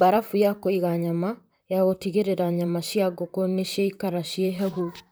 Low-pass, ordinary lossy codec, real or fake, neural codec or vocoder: none; none; fake; vocoder, 44.1 kHz, 128 mel bands, Pupu-Vocoder